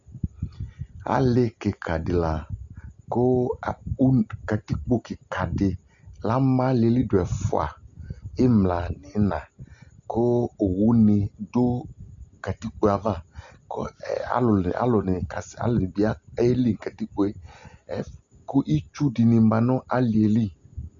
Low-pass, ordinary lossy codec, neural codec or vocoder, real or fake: 7.2 kHz; Opus, 64 kbps; none; real